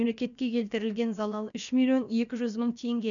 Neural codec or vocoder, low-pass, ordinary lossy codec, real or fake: codec, 16 kHz, about 1 kbps, DyCAST, with the encoder's durations; 7.2 kHz; none; fake